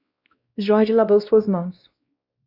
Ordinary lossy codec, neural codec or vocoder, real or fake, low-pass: Opus, 64 kbps; codec, 16 kHz, 1 kbps, X-Codec, WavLM features, trained on Multilingual LibriSpeech; fake; 5.4 kHz